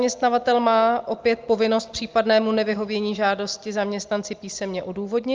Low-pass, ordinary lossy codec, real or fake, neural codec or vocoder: 7.2 kHz; Opus, 32 kbps; real; none